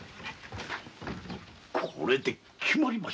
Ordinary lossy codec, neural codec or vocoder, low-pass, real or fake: none; none; none; real